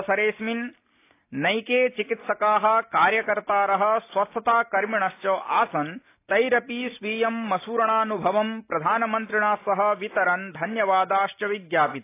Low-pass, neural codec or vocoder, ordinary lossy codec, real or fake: 3.6 kHz; none; AAC, 24 kbps; real